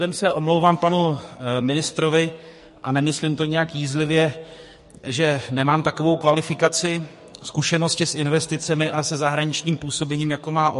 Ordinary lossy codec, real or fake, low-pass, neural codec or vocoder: MP3, 48 kbps; fake; 14.4 kHz; codec, 44.1 kHz, 2.6 kbps, SNAC